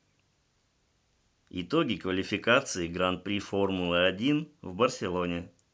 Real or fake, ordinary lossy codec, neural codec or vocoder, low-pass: real; none; none; none